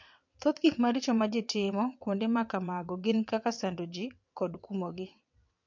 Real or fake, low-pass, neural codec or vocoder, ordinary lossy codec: fake; 7.2 kHz; vocoder, 22.05 kHz, 80 mel bands, WaveNeXt; MP3, 48 kbps